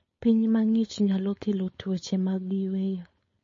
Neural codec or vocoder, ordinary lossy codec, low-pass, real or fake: codec, 16 kHz, 4.8 kbps, FACodec; MP3, 32 kbps; 7.2 kHz; fake